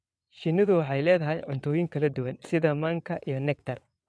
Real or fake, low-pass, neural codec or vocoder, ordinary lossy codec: fake; none; vocoder, 22.05 kHz, 80 mel bands, WaveNeXt; none